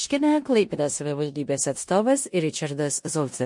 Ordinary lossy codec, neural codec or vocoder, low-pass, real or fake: MP3, 48 kbps; codec, 16 kHz in and 24 kHz out, 0.9 kbps, LongCat-Audio-Codec, four codebook decoder; 10.8 kHz; fake